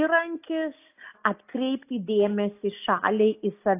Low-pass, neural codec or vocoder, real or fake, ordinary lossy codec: 3.6 kHz; none; real; AAC, 24 kbps